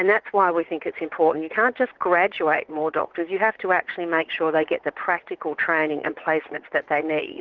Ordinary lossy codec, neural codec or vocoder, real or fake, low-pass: Opus, 16 kbps; vocoder, 44.1 kHz, 80 mel bands, Vocos; fake; 7.2 kHz